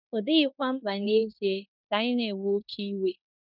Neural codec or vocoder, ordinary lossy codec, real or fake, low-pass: codec, 16 kHz in and 24 kHz out, 0.9 kbps, LongCat-Audio-Codec, fine tuned four codebook decoder; none; fake; 5.4 kHz